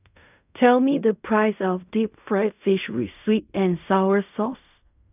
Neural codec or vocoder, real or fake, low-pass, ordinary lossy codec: codec, 16 kHz in and 24 kHz out, 0.4 kbps, LongCat-Audio-Codec, fine tuned four codebook decoder; fake; 3.6 kHz; none